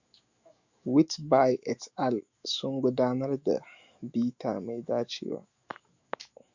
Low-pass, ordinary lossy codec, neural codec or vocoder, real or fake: 7.2 kHz; Opus, 64 kbps; autoencoder, 48 kHz, 128 numbers a frame, DAC-VAE, trained on Japanese speech; fake